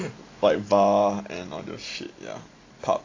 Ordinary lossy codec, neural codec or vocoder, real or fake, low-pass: AAC, 32 kbps; none; real; 7.2 kHz